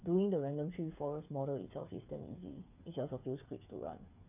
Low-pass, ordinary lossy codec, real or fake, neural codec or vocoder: 3.6 kHz; none; fake; codec, 16 kHz, 8 kbps, FreqCodec, smaller model